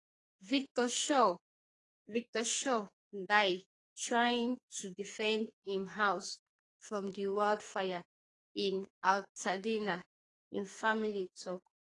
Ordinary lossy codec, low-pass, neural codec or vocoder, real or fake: AAC, 32 kbps; 10.8 kHz; codec, 44.1 kHz, 2.6 kbps, SNAC; fake